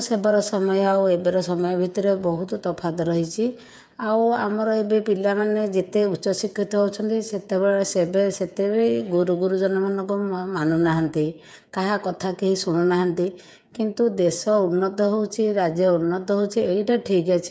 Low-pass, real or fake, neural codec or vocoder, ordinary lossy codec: none; fake; codec, 16 kHz, 8 kbps, FreqCodec, smaller model; none